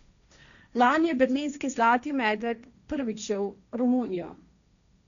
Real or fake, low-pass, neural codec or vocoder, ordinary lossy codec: fake; 7.2 kHz; codec, 16 kHz, 1.1 kbps, Voila-Tokenizer; none